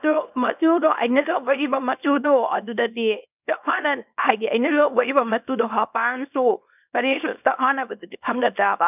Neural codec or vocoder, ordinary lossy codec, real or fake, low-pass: codec, 24 kHz, 0.9 kbps, WavTokenizer, small release; none; fake; 3.6 kHz